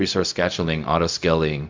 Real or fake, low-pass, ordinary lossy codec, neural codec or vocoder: fake; 7.2 kHz; MP3, 64 kbps; codec, 16 kHz, 0.4 kbps, LongCat-Audio-Codec